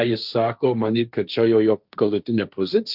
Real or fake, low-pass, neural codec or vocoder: fake; 5.4 kHz; codec, 16 kHz, 1.1 kbps, Voila-Tokenizer